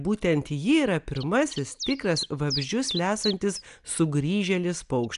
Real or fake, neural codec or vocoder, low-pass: real; none; 10.8 kHz